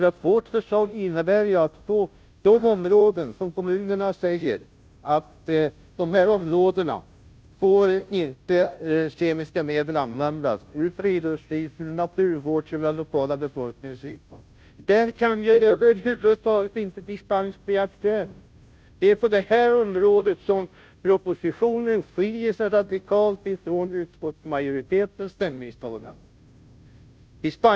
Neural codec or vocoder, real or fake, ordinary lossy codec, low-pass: codec, 16 kHz, 0.5 kbps, FunCodec, trained on Chinese and English, 25 frames a second; fake; none; none